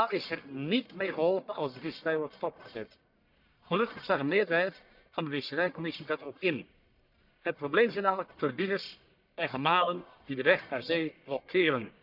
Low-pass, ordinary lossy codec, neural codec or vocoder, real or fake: 5.4 kHz; none; codec, 44.1 kHz, 1.7 kbps, Pupu-Codec; fake